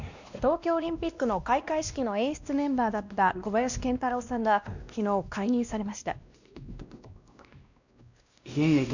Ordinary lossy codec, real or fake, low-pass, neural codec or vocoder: none; fake; 7.2 kHz; codec, 16 kHz, 1 kbps, X-Codec, WavLM features, trained on Multilingual LibriSpeech